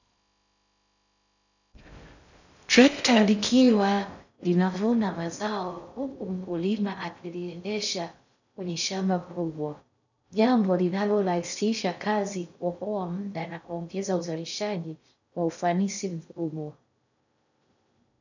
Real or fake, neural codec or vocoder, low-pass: fake; codec, 16 kHz in and 24 kHz out, 0.6 kbps, FocalCodec, streaming, 2048 codes; 7.2 kHz